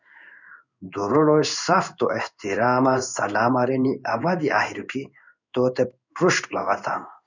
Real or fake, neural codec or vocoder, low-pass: fake; codec, 16 kHz in and 24 kHz out, 1 kbps, XY-Tokenizer; 7.2 kHz